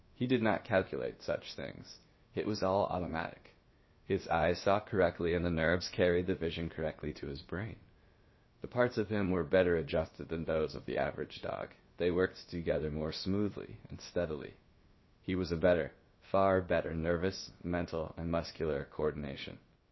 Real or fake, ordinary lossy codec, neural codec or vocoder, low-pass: fake; MP3, 24 kbps; codec, 16 kHz, about 1 kbps, DyCAST, with the encoder's durations; 7.2 kHz